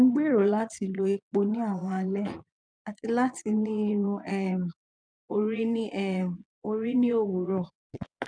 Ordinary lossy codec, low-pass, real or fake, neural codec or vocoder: none; 9.9 kHz; fake; vocoder, 22.05 kHz, 80 mel bands, Vocos